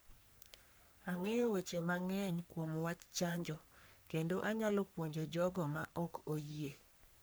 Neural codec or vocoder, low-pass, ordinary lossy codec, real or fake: codec, 44.1 kHz, 3.4 kbps, Pupu-Codec; none; none; fake